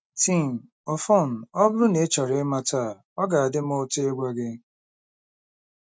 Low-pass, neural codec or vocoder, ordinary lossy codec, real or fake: none; none; none; real